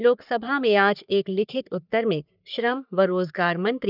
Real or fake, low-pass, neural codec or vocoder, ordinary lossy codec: fake; 5.4 kHz; codec, 44.1 kHz, 3.4 kbps, Pupu-Codec; none